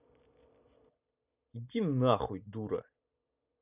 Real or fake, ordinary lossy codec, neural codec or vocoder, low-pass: real; none; none; 3.6 kHz